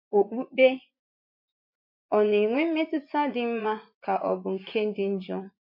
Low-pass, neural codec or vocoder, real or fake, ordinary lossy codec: 5.4 kHz; vocoder, 24 kHz, 100 mel bands, Vocos; fake; MP3, 32 kbps